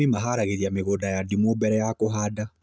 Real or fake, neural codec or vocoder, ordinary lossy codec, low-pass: real; none; none; none